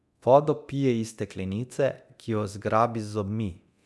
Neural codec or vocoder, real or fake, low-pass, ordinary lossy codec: codec, 24 kHz, 0.9 kbps, DualCodec; fake; none; none